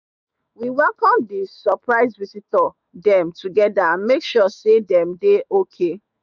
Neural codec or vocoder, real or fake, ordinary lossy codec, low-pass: autoencoder, 48 kHz, 128 numbers a frame, DAC-VAE, trained on Japanese speech; fake; none; 7.2 kHz